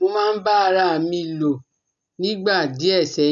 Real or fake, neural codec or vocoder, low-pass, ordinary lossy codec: real; none; 7.2 kHz; none